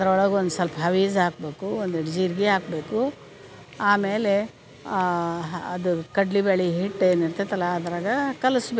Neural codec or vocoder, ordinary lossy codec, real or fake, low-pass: none; none; real; none